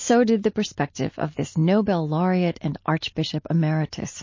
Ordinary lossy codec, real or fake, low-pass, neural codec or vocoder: MP3, 32 kbps; real; 7.2 kHz; none